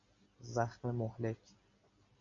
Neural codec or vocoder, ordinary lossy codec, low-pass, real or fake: none; AAC, 64 kbps; 7.2 kHz; real